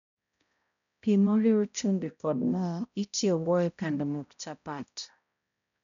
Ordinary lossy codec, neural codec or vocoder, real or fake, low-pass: none; codec, 16 kHz, 0.5 kbps, X-Codec, HuBERT features, trained on balanced general audio; fake; 7.2 kHz